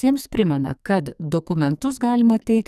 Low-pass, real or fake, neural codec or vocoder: 14.4 kHz; fake; codec, 44.1 kHz, 2.6 kbps, SNAC